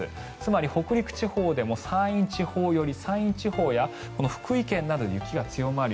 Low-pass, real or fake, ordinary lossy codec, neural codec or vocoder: none; real; none; none